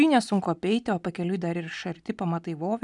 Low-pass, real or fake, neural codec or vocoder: 10.8 kHz; real; none